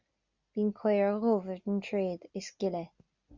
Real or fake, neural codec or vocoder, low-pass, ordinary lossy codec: real; none; 7.2 kHz; Opus, 64 kbps